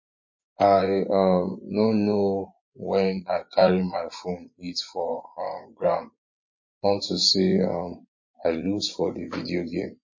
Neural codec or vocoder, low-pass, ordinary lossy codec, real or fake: vocoder, 24 kHz, 100 mel bands, Vocos; 7.2 kHz; MP3, 32 kbps; fake